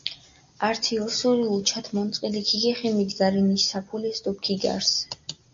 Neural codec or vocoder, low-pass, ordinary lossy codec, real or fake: none; 7.2 kHz; AAC, 64 kbps; real